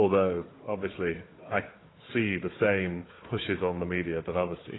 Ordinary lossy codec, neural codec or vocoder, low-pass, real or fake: AAC, 16 kbps; codec, 16 kHz, 4 kbps, FunCodec, trained on Chinese and English, 50 frames a second; 7.2 kHz; fake